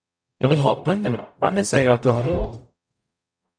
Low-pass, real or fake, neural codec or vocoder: 9.9 kHz; fake; codec, 44.1 kHz, 0.9 kbps, DAC